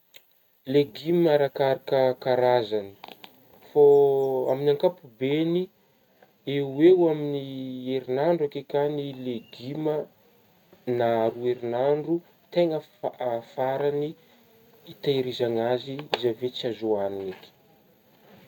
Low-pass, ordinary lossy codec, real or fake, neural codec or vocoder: 19.8 kHz; none; real; none